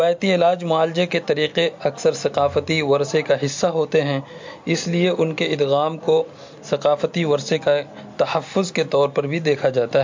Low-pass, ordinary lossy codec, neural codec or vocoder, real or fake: 7.2 kHz; MP3, 48 kbps; none; real